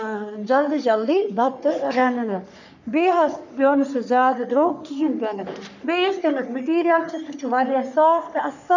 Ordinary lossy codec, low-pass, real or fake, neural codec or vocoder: none; 7.2 kHz; fake; codec, 44.1 kHz, 3.4 kbps, Pupu-Codec